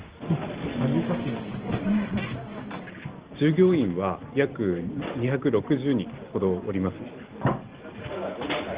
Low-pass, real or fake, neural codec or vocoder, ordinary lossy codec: 3.6 kHz; real; none; Opus, 16 kbps